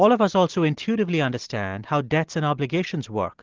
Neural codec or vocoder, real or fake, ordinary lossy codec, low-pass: none; real; Opus, 16 kbps; 7.2 kHz